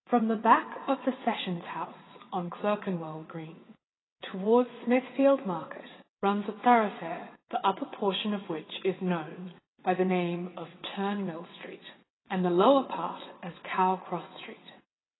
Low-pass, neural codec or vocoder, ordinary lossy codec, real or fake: 7.2 kHz; vocoder, 22.05 kHz, 80 mel bands, WaveNeXt; AAC, 16 kbps; fake